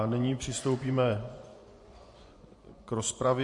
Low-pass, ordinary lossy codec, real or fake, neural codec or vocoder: 10.8 kHz; MP3, 48 kbps; fake; vocoder, 24 kHz, 100 mel bands, Vocos